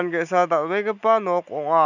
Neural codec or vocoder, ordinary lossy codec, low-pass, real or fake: none; none; 7.2 kHz; real